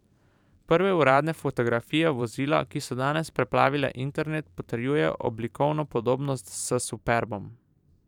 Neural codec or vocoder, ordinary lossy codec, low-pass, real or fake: vocoder, 44.1 kHz, 128 mel bands every 512 samples, BigVGAN v2; none; 19.8 kHz; fake